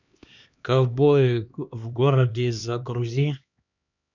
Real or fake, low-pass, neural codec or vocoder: fake; 7.2 kHz; codec, 16 kHz, 2 kbps, X-Codec, HuBERT features, trained on LibriSpeech